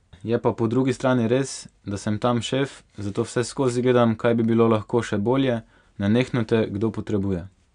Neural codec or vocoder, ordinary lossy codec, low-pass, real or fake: none; none; 9.9 kHz; real